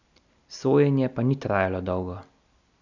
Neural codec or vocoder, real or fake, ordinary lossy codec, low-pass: none; real; none; 7.2 kHz